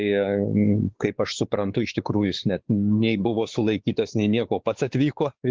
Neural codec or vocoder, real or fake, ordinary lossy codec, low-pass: codec, 16 kHz, 4 kbps, X-Codec, WavLM features, trained on Multilingual LibriSpeech; fake; Opus, 16 kbps; 7.2 kHz